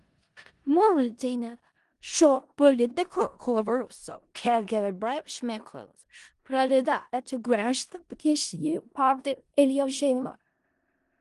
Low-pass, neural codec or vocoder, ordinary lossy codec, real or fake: 10.8 kHz; codec, 16 kHz in and 24 kHz out, 0.4 kbps, LongCat-Audio-Codec, four codebook decoder; Opus, 24 kbps; fake